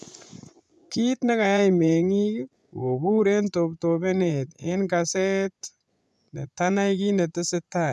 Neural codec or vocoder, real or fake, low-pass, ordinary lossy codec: vocoder, 24 kHz, 100 mel bands, Vocos; fake; none; none